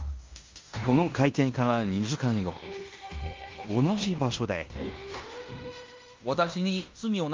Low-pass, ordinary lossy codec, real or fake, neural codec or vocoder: 7.2 kHz; Opus, 32 kbps; fake; codec, 16 kHz in and 24 kHz out, 0.9 kbps, LongCat-Audio-Codec, fine tuned four codebook decoder